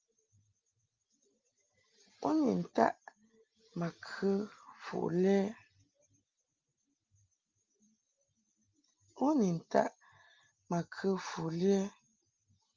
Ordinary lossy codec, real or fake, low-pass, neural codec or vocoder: Opus, 32 kbps; real; 7.2 kHz; none